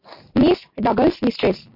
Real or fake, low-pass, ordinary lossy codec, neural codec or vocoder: real; 5.4 kHz; MP3, 32 kbps; none